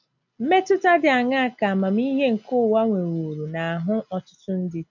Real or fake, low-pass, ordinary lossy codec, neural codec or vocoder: real; 7.2 kHz; none; none